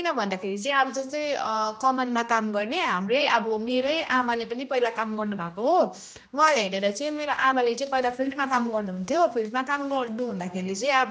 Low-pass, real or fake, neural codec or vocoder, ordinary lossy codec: none; fake; codec, 16 kHz, 1 kbps, X-Codec, HuBERT features, trained on general audio; none